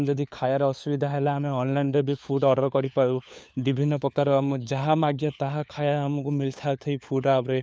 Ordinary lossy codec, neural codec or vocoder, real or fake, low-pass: none; codec, 16 kHz, 4 kbps, FunCodec, trained on LibriTTS, 50 frames a second; fake; none